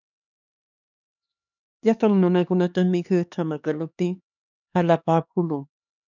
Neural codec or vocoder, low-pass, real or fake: codec, 16 kHz, 2 kbps, X-Codec, HuBERT features, trained on LibriSpeech; 7.2 kHz; fake